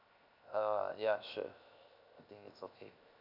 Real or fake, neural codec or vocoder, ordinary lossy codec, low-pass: fake; codec, 16 kHz, 0.7 kbps, FocalCodec; none; 5.4 kHz